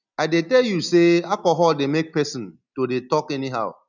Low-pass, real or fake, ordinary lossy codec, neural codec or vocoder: 7.2 kHz; real; none; none